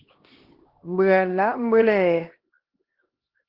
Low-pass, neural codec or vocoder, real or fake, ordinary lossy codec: 5.4 kHz; codec, 16 kHz, 1 kbps, X-Codec, HuBERT features, trained on LibriSpeech; fake; Opus, 16 kbps